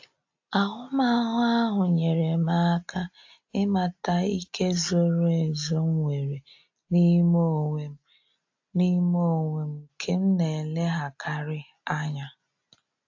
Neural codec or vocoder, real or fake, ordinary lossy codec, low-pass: none; real; AAC, 48 kbps; 7.2 kHz